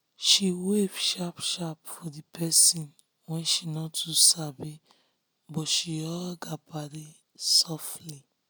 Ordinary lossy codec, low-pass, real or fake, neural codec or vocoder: none; none; real; none